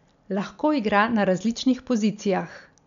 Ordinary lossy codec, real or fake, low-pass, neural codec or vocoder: none; real; 7.2 kHz; none